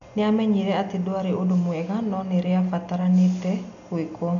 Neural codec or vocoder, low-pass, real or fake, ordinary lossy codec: none; 7.2 kHz; real; none